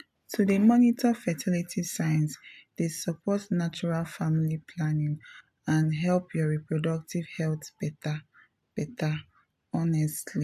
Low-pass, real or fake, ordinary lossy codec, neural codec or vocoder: 14.4 kHz; real; AAC, 96 kbps; none